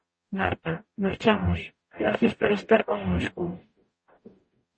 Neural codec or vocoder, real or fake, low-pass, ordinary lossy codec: codec, 44.1 kHz, 0.9 kbps, DAC; fake; 9.9 kHz; MP3, 32 kbps